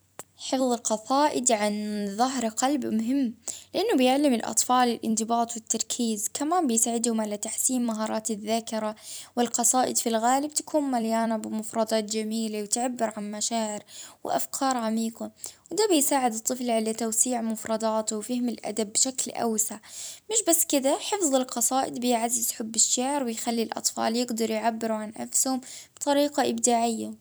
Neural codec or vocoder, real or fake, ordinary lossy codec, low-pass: vocoder, 44.1 kHz, 128 mel bands every 256 samples, BigVGAN v2; fake; none; none